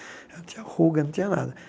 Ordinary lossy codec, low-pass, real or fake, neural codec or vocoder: none; none; real; none